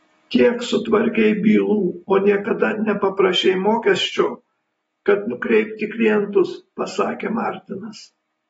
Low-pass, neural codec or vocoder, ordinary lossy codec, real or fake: 19.8 kHz; none; AAC, 24 kbps; real